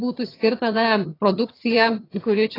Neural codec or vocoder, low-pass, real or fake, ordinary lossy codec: vocoder, 22.05 kHz, 80 mel bands, HiFi-GAN; 5.4 kHz; fake; AAC, 24 kbps